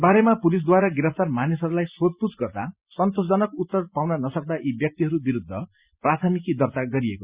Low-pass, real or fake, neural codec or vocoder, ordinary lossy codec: 3.6 kHz; real; none; Opus, 64 kbps